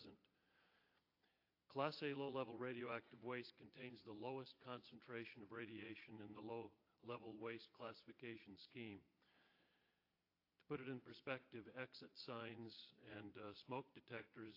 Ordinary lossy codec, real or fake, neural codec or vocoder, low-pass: AAC, 32 kbps; fake; vocoder, 22.05 kHz, 80 mel bands, Vocos; 5.4 kHz